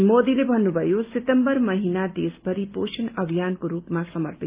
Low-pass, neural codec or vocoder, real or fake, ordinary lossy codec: 3.6 kHz; none; real; Opus, 24 kbps